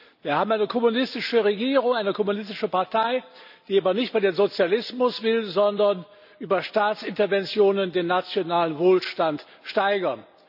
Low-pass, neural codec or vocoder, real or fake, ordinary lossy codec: 5.4 kHz; none; real; none